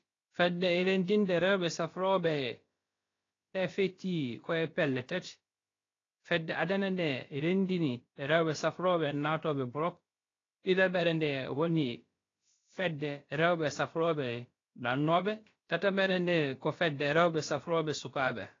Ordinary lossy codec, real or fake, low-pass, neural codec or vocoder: AAC, 32 kbps; fake; 7.2 kHz; codec, 16 kHz, about 1 kbps, DyCAST, with the encoder's durations